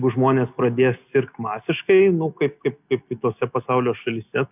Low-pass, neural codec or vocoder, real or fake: 3.6 kHz; none; real